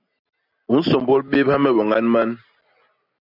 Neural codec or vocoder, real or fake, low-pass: none; real; 5.4 kHz